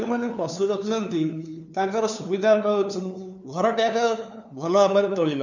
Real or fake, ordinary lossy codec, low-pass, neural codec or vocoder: fake; none; 7.2 kHz; codec, 16 kHz, 2 kbps, FunCodec, trained on LibriTTS, 25 frames a second